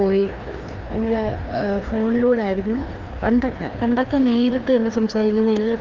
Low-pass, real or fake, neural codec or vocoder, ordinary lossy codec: 7.2 kHz; fake; codec, 16 kHz, 2 kbps, FreqCodec, larger model; Opus, 32 kbps